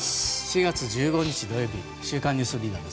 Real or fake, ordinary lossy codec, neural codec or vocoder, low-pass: real; none; none; none